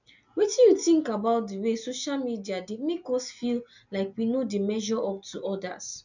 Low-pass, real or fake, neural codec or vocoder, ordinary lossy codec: 7.2 kHz; real; none; none